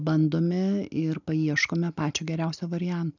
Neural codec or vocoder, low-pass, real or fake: none; 7.2 kHz; real